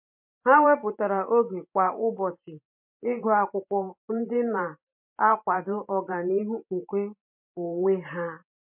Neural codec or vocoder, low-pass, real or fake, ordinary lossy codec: vocoder, 44.1 kHz, 128 mel bands every 512 samples, BigVGAN v2; 3.6 kHz; fake; MP3, 32 kbps